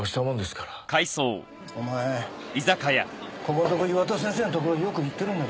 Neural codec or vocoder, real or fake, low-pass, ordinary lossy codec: none; real; none; none